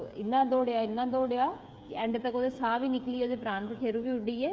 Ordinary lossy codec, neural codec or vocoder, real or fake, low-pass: none; codec, 16 kHz, 8 kbps, FreqCodec, smaller model; fake; none